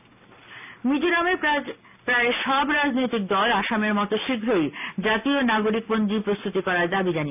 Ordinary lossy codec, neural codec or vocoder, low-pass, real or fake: none; none; 3.6 kHz; real